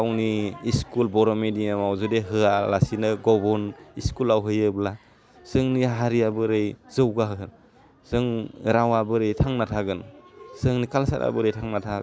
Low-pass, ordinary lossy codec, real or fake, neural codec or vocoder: none; none; real; none